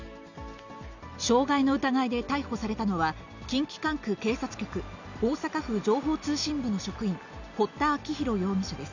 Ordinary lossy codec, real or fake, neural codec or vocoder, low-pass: none; real; none; 7.2 kHz